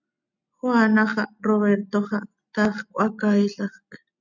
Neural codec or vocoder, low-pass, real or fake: none; 7.2 kHz; real